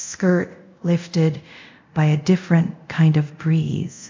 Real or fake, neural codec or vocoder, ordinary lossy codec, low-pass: fake; codec, 24 kHz, 0.5 kbps, DualCodec; MP3, 64 kbps; 7.2 kHz